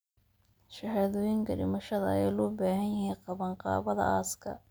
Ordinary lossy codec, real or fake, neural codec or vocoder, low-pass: none; real; none; none